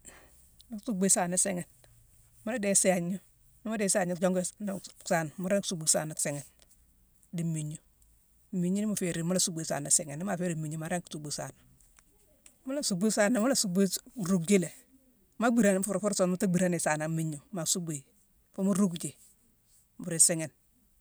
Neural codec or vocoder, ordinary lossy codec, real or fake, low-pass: none; none; real; none